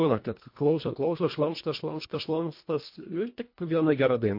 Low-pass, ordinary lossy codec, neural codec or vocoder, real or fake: 5.4 kHz; MP3, 32 kbps; codec, 24 kHz, 1.5 kbps, HILCodec; fake